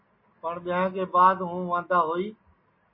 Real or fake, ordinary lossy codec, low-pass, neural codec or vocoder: real; MP3, 24 kbps; 5.4 kHz; none